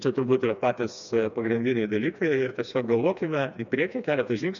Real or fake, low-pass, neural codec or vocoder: fake; 7.2 kHz; codec, 16 kHz, 2 kbps, FreqCodec, smaller model